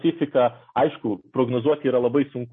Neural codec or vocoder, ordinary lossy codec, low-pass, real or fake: none; MP3, 32 kbps; 9.9 kHz; real